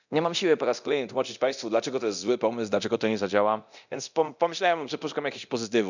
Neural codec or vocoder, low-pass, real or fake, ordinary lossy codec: codec, 24 kHz, 0.9 kbps, DualCodec; 7.2 kHz; fake; none